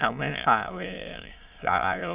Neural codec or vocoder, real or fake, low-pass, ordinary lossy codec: autoencoder, 22.05 kHz, a latent of 192 numbers a frame, VITS, trained on many speakers; fake; 3.6 kHz; Opus, 32 kbps